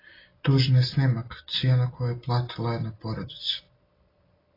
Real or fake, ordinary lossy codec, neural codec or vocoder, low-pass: real; AAC, 24 kbps; none; 5.4 kHz